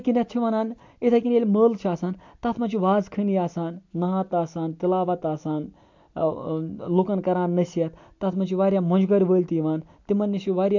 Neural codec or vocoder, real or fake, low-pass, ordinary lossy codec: none; real; 7.2 kHz; MP3, 48 kbps